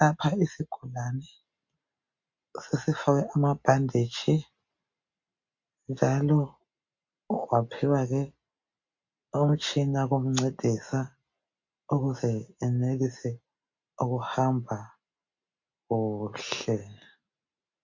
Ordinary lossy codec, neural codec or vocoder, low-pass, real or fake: MP3, 48 kbps; none; 7.2 kHz; real